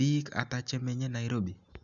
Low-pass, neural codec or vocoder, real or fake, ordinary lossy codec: 7.2 kHz; none; real; none